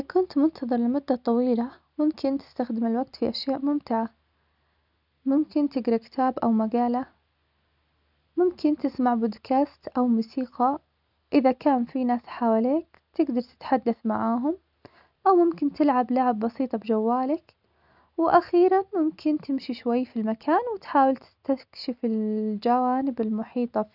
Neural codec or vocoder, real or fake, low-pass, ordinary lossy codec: none; real; 5.4 kHz; none